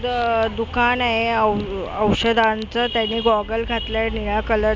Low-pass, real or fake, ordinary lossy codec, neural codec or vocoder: none; real; none; none